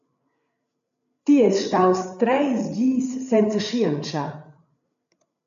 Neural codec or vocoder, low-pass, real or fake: codec, 16 kHz, 8 kbps, FreqCodec, larger model; 7.2 kHz; fake